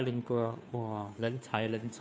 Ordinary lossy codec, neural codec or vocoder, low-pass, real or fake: none; codec, 16 kHz, 2 kbps, FunCodec, trained on Chinese and English, 25 frames a second; none; fake